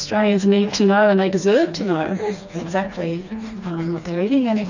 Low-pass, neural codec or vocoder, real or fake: 7.2 kHz; codec, 16 kHz, 2 kbps, FreqCodec, smaller model; fake